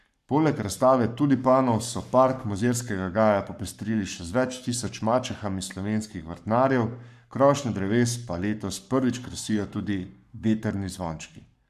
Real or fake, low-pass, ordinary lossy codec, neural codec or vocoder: fake; 14.4 kHz; none; codec, 44.1 kHz, 7.8 kbps, Pupu-Codec